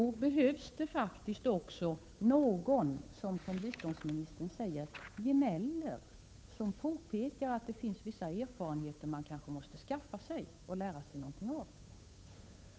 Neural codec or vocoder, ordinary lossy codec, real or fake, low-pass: codec, 16 kHz, 8 kbps, FunCodec, trained on Chinese and English, 25 frames a second; none; fake; none